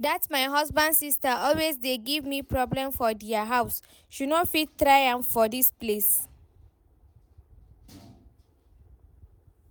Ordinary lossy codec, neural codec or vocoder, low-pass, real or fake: none; none; none; real